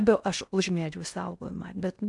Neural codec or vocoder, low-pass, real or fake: codec, 16 kHz in and 24 kHz out, 0.6 kbps, FocalCodec, streaming, 4096 codes; 10.8 kHz; fake